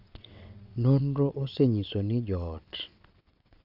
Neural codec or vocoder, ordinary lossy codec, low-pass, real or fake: vocoder, 22.05 kHz, 80 mel bands, WaveNeXt; none; 5.4 kHz; fake